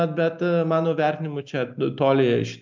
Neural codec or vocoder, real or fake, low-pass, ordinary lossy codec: none; real; 7.2 kHz; MP3, 64 kbps